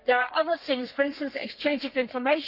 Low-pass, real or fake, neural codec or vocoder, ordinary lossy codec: 5.4 kHz; fake; codec, 32 kHz, 1.9 kbps, SNAC; none